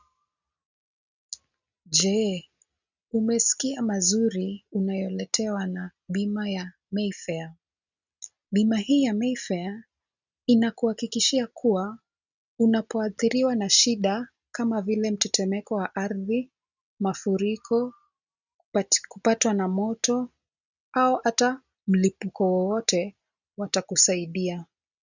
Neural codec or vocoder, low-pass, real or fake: none; 7.2 kHz; real